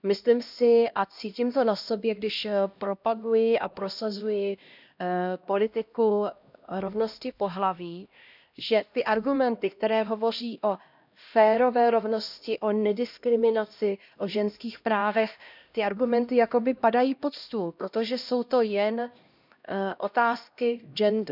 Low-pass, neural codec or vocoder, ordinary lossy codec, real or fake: 5.4 kHz; codec, 16 kHz, 1 kbps, X-Codec, HuBERT features, trained on LibriSpeech; none; fake